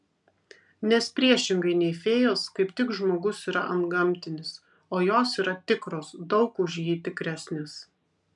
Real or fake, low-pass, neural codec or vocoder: real; 10.8 kHz; none